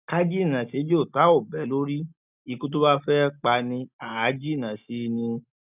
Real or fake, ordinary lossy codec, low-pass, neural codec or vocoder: real; none; 3.6 kHz; none